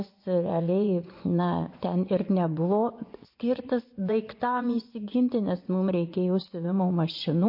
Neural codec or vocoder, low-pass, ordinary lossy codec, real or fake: vocoder, 24 kHz, 100 mel bands, Vocos; 5.4 kHz; MP3, 32 kbps; fake